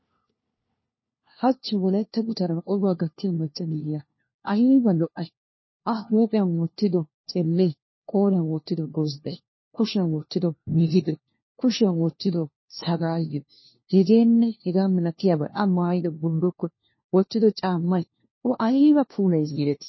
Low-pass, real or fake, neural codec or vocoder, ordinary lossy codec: 7.2 kHz; fake; codec, 16 kHz, 1 kbps, FunCodec, trained on LibriTTS, 50 frames a second; MP3, 24 kbps